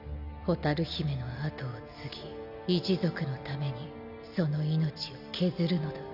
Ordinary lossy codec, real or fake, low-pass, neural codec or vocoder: none; real; 5.4 kHz; none